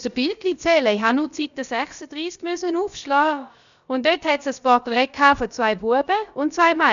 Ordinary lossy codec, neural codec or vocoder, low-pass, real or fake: none; codec, 16 kHz, about 1 kbps, DyCAST, with the encoder's durations; 7.2 kHz; fake